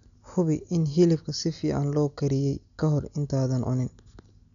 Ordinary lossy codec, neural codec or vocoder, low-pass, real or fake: none; none; 7.2 kHz; real